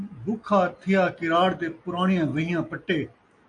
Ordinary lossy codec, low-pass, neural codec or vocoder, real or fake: AAC, 48 kbps; 9.9 kHz; none; real